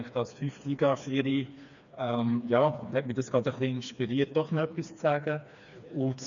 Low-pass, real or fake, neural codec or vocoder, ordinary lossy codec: 7.2 kHz; fake; codec, 16 kHz, 2 kbps, FreqCodec, smaller model; none